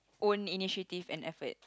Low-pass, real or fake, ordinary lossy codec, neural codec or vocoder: none; real; none; none